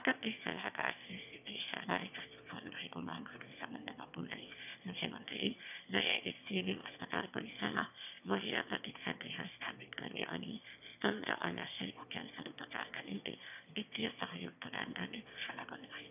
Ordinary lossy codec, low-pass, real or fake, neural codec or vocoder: none; 3.6 kHz; fake; autoencoder, 22.05 kHz, a latent of 192 numbers a frame, VITS, trained on one speaker